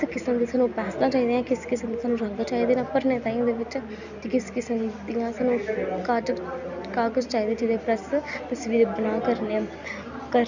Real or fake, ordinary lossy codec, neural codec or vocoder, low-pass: real; none; none; 7.2 kHz